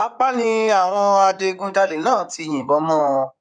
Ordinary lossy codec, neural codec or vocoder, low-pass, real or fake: none; codec, 16 kHz in and 24 kHz out, 2.2 kbps, FireRedTTS-2 codec; 9.9 kHz; fake